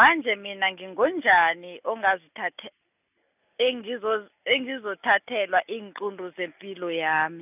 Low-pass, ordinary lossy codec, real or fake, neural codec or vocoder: 3.6 kHz; none; real; none